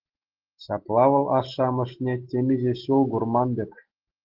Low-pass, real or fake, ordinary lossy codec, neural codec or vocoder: 5.4 kHz; real; Opus, 16 kbps; none